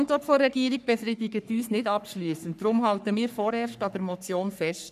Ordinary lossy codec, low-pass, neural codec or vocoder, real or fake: none; 14.4 kHz; codec, 44.1 kHz, 3.4 kbps, Pupu-Codec; fake